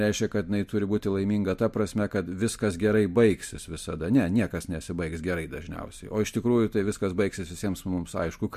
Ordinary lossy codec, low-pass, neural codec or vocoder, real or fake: MP3, 64 kbps; 14.4 kHz; none; real